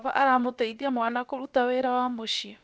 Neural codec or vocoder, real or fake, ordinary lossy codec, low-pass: codec, 16 kHz, about 1 kbps, DyCAST, with the encoder's durations; fake; none; none